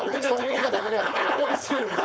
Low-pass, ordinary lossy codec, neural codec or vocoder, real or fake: none; none; codec, 16 kHz, 4.8 kbps, FACodec; fake